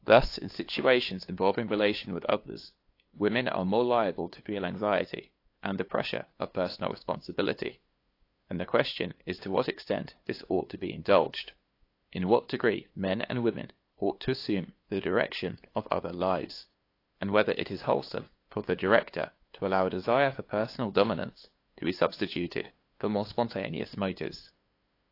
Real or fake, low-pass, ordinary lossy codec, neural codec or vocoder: fake; 5.4 kHz; AAC, 32 kbps; codec, 16 kHz, 2 kbps, FunCodec, trained on LibriTTS, 25 frames a second